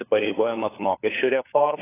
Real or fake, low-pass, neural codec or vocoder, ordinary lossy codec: fake; 3.6 kHz; codec, 16 kHz, 4 kbps, FunCodec, trained on LibriTTS, 50 frames a second; AAC, 16 kbps